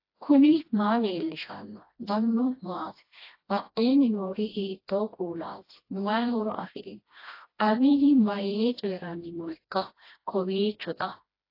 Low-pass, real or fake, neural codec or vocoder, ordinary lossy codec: 5.4 kHz; fake; codec, 16 kHz, 1 kbps, FreqCodec, smaller model; AAC, 32 kbps